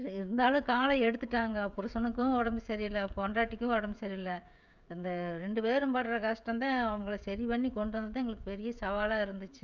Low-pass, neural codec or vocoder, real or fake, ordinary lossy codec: 7.2 kHz; codec, 16 kHz, 16 kbps, FreqCodec, smaller model; fake; none